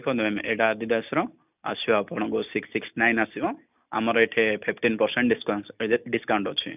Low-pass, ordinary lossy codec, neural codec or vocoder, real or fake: 3.6 kHz; none; none; real